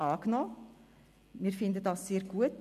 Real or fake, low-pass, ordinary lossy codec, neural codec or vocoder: real; 14.4 kHz; AAC, 96 kbps; none